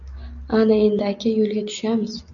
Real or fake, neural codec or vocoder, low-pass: real; none; 7.2 kHz